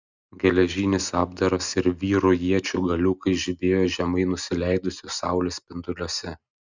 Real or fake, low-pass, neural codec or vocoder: real; 7.2 kHz; none